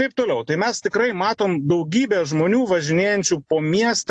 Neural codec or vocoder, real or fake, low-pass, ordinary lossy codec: none; real; 9.9 kHz; AAC, 64 kbps